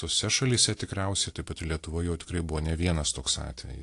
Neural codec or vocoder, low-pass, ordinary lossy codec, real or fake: none; 10.8 kHz; AAC, 48 kbps; real